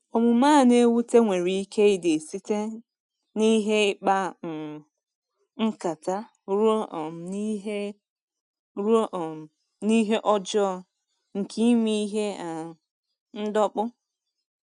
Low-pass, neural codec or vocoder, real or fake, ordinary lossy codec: 10.8 kHz; none; real; none